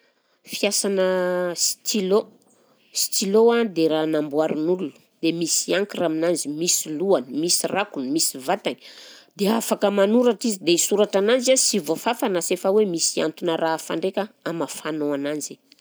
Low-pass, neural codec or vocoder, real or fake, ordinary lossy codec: none; none; real; none